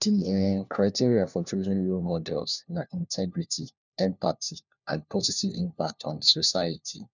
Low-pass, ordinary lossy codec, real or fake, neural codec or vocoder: 7.2 kHz; none; fake; codec, 16 kHz, 1 kbps, FunCodec, trained on LibriTTS, 50 frames a second